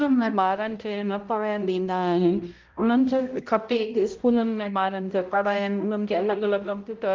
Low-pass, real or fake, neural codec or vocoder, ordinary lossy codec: 7.2 kHz; fake; codec, 16 kHz, 0.5 kbps, X-Codec, HuBERT features, trained on balanced general audio; Opus, 32 kbps